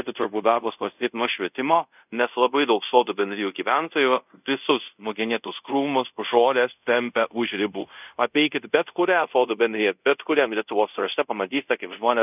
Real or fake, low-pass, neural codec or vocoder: fake; 3.6 kHz; codec, 24 kHz, 0.5 kbps, DualCodec